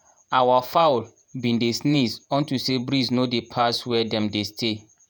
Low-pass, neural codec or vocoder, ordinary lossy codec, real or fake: none; vocoder, 48 kHz, 128 mel bands, Vocos; none; fake